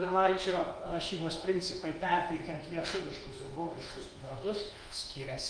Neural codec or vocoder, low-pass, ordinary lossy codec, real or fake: codec, 24 kHz, 1.2 kbps, DualCodec; 9.9 kHz; Opus, 24 kbps; fake